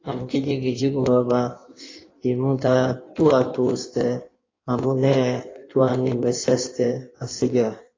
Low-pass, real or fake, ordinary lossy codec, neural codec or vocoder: 7.2 kHz; fake; AAC, 32 kbps; codec, 16 kHz in and 24 kHz out, 1.1 kbps, FireRedTTS-2 codec